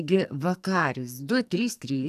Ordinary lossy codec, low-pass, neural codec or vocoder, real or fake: AAC, 96 kbps; 14.4 kHz; codec, 44.1 kHz, 2.6 kbps, SNAC; fake